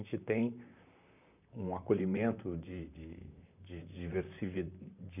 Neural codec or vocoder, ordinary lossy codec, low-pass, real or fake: vocoder, 44.1 kHz, 128 mel bands, Pupu-Vocoder; none; 3.6 kHz; fake